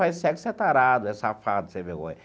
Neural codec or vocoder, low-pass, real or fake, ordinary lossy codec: none; none; real; none